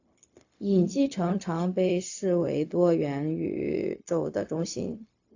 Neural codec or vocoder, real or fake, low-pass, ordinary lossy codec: codec, 16 kHz, 0.4 kbps, LongCat-Audio-Codec; fake; 7.2 kHz; AAC, 48 kbps